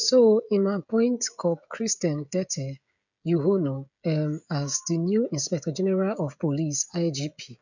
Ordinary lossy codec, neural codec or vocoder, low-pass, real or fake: none; codec, 16 kHz, 16 kbps, FreqCodec, smaller model; 7.2 kHz; fake